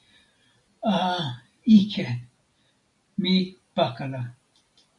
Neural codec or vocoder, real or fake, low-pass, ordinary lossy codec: none; real; 10.8 kHz; AAC, 64 kbps